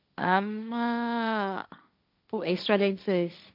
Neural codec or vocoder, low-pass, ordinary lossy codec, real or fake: codec, 16 kHz, 1.1 kbps, Voila-Tokenizer; 5.4 kHz; none; fake